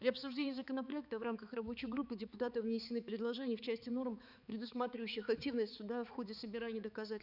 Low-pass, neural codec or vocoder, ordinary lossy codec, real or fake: 5.4 kHz; codec, 16 kHz, 4 kbps, X-Codec, HuBERT features, trained on balanced general audio; none; fake